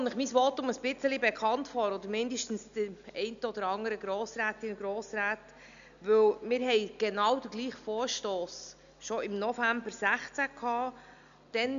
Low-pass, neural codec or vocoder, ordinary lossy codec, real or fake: 7.2 kHz; none; none; real